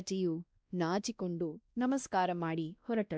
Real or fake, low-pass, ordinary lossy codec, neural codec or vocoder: fake; none; none; codec, 16 kHz, 1 kbps, X-Codec, WavLM features, trained on Multilingual LibriSpeech